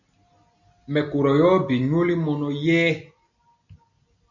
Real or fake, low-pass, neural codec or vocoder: real; 7.2 kHz; none